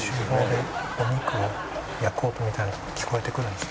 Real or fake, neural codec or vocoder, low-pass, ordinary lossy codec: real; none; none; none